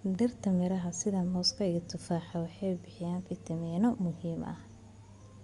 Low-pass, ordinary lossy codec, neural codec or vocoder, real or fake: 10.8 kHz; Opus, 64 kbps; none; real